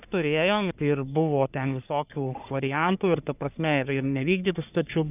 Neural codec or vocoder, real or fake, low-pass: codec, 44.1 kHz, 3.4 kbps, Pupu-Codec; fake; 3.6 kHz